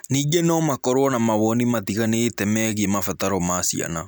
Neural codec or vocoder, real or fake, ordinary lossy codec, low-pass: none; real; none; none